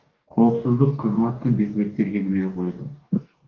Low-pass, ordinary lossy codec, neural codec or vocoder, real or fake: 7.2 kHz; Opus, 32 kbps; codec, 44.1 kHz, 2.6 kbps, DAC; fake